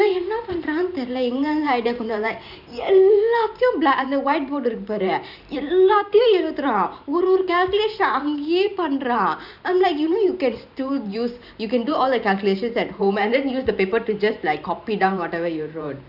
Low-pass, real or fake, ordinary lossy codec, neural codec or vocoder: 5.4 kHz; fake; none; vocoder, 44.1 kHz, 128 mel bands every 512 samples, BigVGAN v2